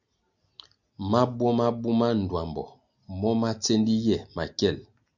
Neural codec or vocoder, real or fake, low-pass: none; real; 7.2 kHz